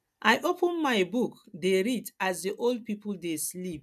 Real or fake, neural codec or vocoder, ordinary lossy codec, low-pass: real; none; none; 14.4 kHz